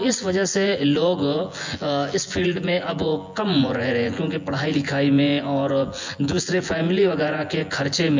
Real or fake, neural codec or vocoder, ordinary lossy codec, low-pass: fake; vocoder, 24 kHz, 100 mel bands, Vocos; MP3, 48 kbps; 7.2 kHz